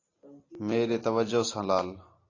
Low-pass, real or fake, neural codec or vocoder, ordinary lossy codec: 7.2 kHz; real; none; AAC, 32 kbps